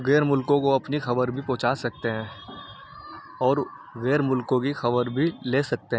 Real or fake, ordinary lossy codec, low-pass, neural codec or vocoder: real; none; none; none